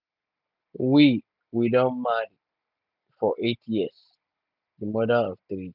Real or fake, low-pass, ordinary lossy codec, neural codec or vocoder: real; 5.4 kHz; none; none